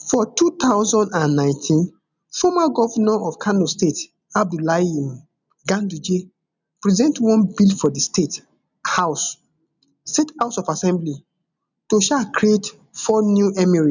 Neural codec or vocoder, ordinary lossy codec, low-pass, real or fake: none; none; 7.2 kHz; real